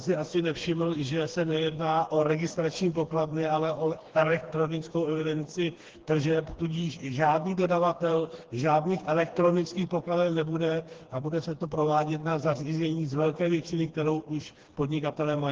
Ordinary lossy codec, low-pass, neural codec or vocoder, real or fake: Opus, 16 kbps; 7.2 kHz; codec, 16 kHz, 2 kbps, FreqCodec, smaller model; fake